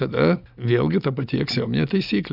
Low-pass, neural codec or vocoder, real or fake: 5.4 kHz; none; real